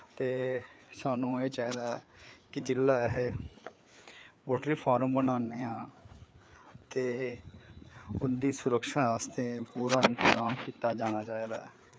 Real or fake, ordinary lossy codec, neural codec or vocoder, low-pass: fake; none; codec, 16 kHz, 4 kbps, FreqCodec, larger model; none